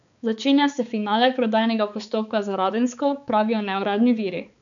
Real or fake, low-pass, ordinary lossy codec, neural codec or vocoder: fake; 7.2 kHz; none; codec, 16 kHz, 4 kbps, X-Codec, HuBERT features, trained on balanced general audio